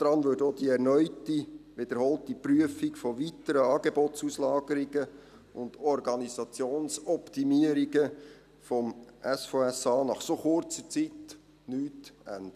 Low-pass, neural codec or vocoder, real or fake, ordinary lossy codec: 14.4 kHz; none; real; none